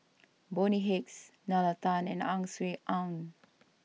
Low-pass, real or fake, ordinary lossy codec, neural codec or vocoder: none; real; none; none